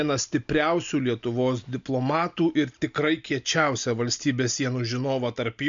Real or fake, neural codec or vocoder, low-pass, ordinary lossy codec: real; none; 7.2 kHz; MP3, 64 kbps